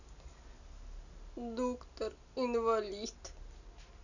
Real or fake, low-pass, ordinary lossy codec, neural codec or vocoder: real; 7.2 kHz; none; none